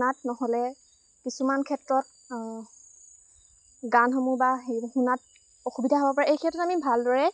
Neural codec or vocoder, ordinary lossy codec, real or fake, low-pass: none; none; real; none